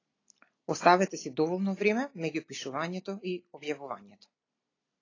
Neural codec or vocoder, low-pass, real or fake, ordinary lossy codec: none; 7.2 kHz; real; AAC, 32 kbps